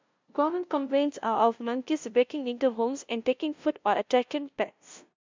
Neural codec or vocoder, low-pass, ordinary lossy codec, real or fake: codec, 16 kHz, 0.5 kbps, FunCodec, trained on LibriTTS, 25 frames a second; 7.2 kHz; MP3, 64 kbps; fake